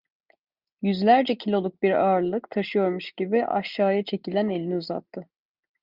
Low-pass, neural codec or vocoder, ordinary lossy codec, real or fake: 5.4 kHz; none; Opus, 64 kbps; real